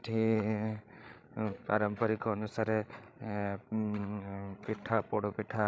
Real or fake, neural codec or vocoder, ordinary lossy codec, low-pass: fake; codec, 16 kHz, 16 kbps, FreqCodec, larger model; none; none